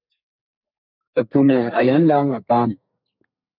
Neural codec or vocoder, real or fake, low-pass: codec, 32 kHz, 1.9 kbps, SNAC; fake; 5.4 kHz